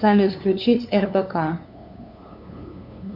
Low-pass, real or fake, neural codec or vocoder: 5.4 kHz; fake; codec, 16 kHz, 2 kbps, FunCodec, trained on LibriTTS, 25 frames a second